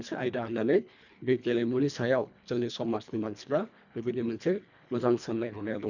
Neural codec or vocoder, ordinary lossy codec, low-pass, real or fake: codec, 24 kHz, 1.5 kbps, HILCodec; none; 7.2 kHz; fake